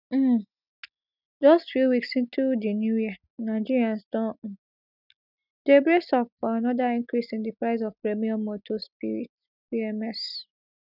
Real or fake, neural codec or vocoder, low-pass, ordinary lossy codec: real; none; 5.4 kHz; none